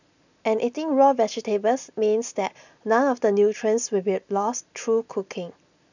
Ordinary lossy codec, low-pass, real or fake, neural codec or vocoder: none; 7.2 kHz; real; none